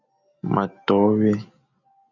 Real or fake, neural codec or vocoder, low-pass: real; none; 7.2 kHz